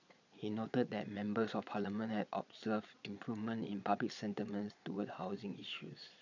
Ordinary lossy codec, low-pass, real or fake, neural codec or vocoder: none; 7.2 kHz; fake; vocoder, 22.05 kHz, 80 mel bands, Vocos